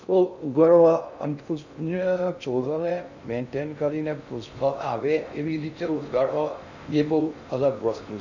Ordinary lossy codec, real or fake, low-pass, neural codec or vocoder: none; fake; 7.2 kHz; codec, 16 kHz in and 24 kHz out, 0.6 kbps, FocalCodec, streaming, 2048 codes